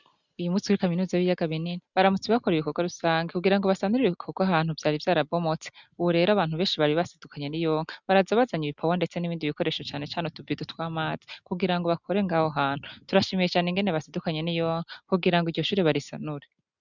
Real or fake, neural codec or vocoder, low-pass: real; none; 7.2 kHz